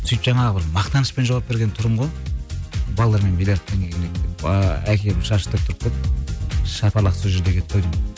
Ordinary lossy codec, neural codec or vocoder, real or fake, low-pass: none; none; real; none